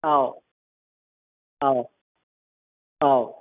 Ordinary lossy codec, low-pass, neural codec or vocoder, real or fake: none; 3.6 kHz; none; real